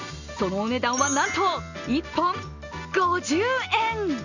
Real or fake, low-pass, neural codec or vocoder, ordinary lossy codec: real; 7.2 kHz; none; none